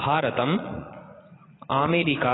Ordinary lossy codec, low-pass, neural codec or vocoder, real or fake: AAC, 16 kbps; 7.2 kHz; vocoder, 44.1 kHz, 80 mel bands, Vocos; fake